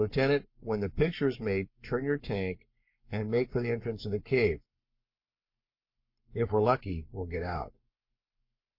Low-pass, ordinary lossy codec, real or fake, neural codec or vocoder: 5.4 kHz; MP3, 32 kbps; fake; codec, 44.1 kHz, 7.8 kbps, Pupu-Codec